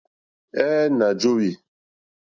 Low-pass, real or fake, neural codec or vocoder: 7.2 kHz; real; none